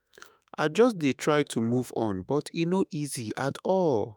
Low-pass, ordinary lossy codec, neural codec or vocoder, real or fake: none; none; autoencoder, 48 kHz, 32 numbers a frame, DAC-VAE, trained on Japanese speech; fake